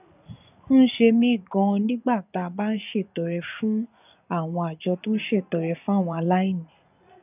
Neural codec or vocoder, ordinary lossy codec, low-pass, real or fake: vocoder, 24 kHz, 100 mel bands, Vocos; none; 3.6 kHz; fake